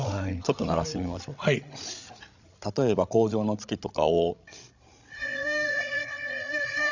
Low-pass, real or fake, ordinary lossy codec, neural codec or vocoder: 7.2 kHz; fake; none; codec, 16 kHz, 8 kbps, FreqCodec, larger model